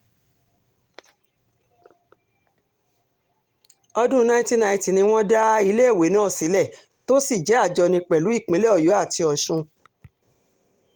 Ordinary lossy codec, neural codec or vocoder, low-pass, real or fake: Opus, 24 kbps; vocoder, 44.1 kHz, 128 mel bands every 512 samples, BigVGAN v2; 19.8 kHz; fake